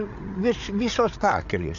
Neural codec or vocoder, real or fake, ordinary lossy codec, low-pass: codec, 16 kHz, 16 kbps, FreqCodec, larger model; fake; AAC, 48 kbps; 7.2 kHz